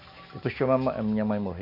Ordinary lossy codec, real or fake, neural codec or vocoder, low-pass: none; real; none; 5.4 kHz